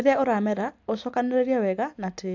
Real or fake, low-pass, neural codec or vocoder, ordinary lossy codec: real; 7.2 kHz; none; AAC, 48 kbps